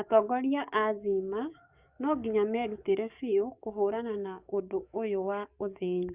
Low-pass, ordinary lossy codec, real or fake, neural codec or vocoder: 3.6 kHz; Opus, 64 kbps; fake; codec, 44.1 kHz, 7.8 kbps, DAC